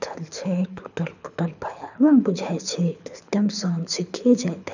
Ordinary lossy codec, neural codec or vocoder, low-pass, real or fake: none; codec, 24 kHz, 6 kbps, HILCodec; 7.2 kHz; fake